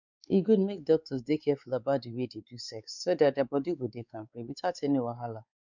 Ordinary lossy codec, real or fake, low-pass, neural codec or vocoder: none; fake; 7.2 kHz; codec, 16 kHz, 4 kbps, X-Codec, WavLM features, trained on Multilingual LibriSpeech